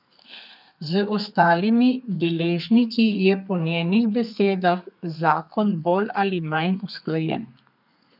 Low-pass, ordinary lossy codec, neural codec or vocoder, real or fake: 5.4 kHz; none; codec, 32 kHz, 1.9 kbps, SNAC; fake